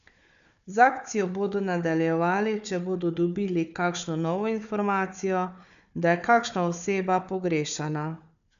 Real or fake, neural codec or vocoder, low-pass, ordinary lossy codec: fake; codec, 16 kHz, 4 kbps, FunCodec, trained on Chinese and English, 50 frames a second; 7.2 kHz; none